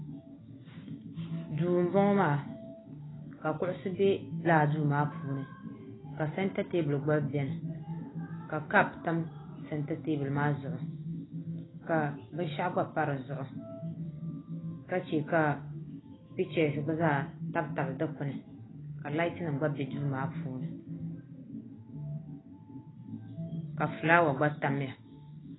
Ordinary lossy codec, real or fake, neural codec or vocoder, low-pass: AAC, 16 kbps; real; none; 7.2 kHz